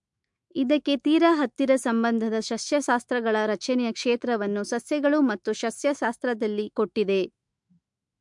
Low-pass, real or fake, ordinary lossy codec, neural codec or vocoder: 10.8 kHz; fake; MP3, 64 kbps; autoencoder, 48 kHz, 128 numbers a frame, DAC-VAE, trained on Japanese speech